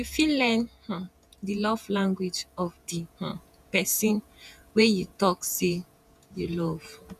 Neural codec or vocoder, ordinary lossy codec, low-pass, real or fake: vocoder, 48 kHz, 128 mel bands, Vocos; none; 14.4 kHz; fake